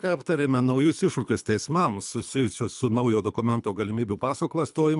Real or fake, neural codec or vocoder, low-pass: fake; codec, 24 kHz, 3 kbps, HILCodec; 10.8 kHz